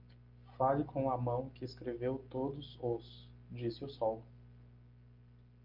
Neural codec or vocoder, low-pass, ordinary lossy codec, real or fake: none; 5.4 kHz; Opus, 32 kbps; real